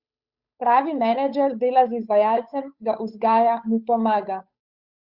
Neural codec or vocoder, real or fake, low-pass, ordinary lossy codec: codec, 16 kHz, 8 kbps, FunCodec, trained on Chinese and English, 25 frames a second; fake; 5.4 kHz; Opus, 64 kbps